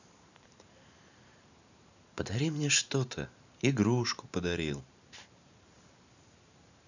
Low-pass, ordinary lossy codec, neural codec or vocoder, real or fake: 7.2 kHz; none; vocoder, 44.1 kHz, 128 mel bands every 512 samples, BigVGAN v2; fake